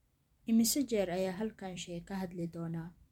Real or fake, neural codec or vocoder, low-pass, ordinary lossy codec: fake; vocoder, 44.1 kHz, 128 mel bands every 256 samples, BigVGAN v2; 19.8 kHz; MP3, 96 kbps